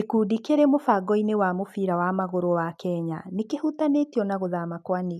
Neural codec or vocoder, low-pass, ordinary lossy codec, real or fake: none; 14.4 kHz; none; real